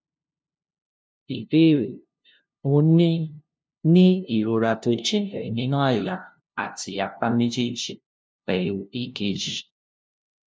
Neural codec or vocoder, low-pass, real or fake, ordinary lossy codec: codec, 16 kHz, 0.5 kbps, FunCodec, trained on LibriTTS, 25 frames a second; none; fake; none